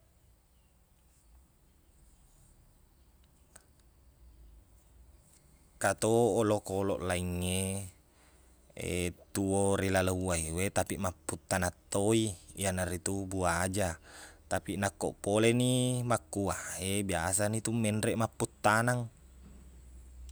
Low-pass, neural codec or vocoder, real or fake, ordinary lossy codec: none; none; real; none